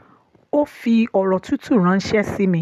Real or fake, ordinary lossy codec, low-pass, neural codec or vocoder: real; none; 14.4 kHz; none